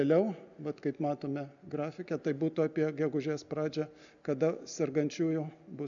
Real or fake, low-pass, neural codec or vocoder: real; 7.2 kHz; none